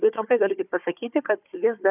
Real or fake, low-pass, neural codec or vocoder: fake; 3.6 kHz; codec, 16 kHz, 4 kbps, FunCodec, trained on Chinese and English, 50 frames a second